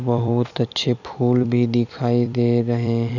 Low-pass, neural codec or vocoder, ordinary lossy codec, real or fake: 7.2 kHz; vocoder, 44.1 kHz, 128 mel bands every 256 samples, BigVGAN v2; none; fake